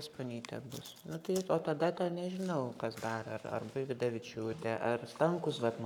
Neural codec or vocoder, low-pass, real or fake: codec, 44.1 kHz, 7.8 kbps, Pupu-Codec; 19.8 kHz; fake